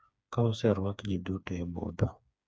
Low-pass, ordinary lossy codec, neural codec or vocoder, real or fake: none; none; codec, 16 kHz, 4 kbps, FreqCodec, smaller model; fake